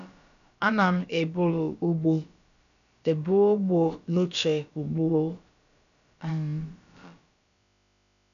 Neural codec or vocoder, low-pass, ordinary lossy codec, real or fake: codec, 16 kHz, about 1 kbps, DyCAST, with the encoder's durations; 7.2 kHz; none; fake